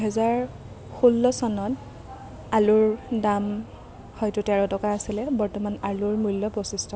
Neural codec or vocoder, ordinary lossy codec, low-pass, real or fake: none; none; none; real